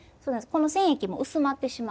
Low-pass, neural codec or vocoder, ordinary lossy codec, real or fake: none; none; none; real